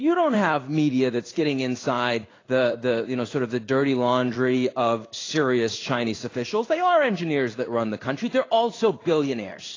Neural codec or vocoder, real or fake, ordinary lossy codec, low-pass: codec, 16 kHz in and 24 kHz out, 1 kbps, XY-Tokenizer; fake; AAC, 32 kbps; 7.2 kHz